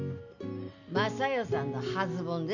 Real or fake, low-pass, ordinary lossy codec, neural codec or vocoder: real; 7.2 kHz; none; none